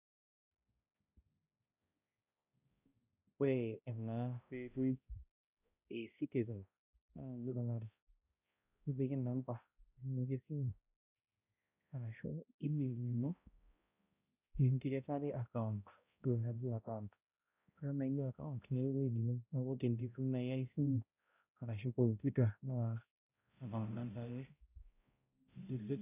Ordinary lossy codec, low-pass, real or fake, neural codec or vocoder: none; 3.6 kHz; fake; codec, 16 kHz, 0.5 kbps, X-Codec, HuBERT features, trained on balanced general audio